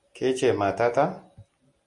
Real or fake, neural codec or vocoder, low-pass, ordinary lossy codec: real; none; 10.8 kHz; MP3, 64 kbps